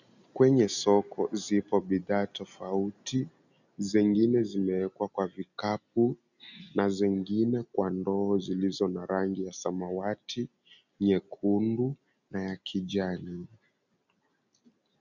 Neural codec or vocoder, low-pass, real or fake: none; 7.2 kHz; real